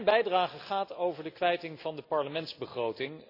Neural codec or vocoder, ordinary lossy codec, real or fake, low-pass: none; AAC, 32 kbps; real; 5.4 kHz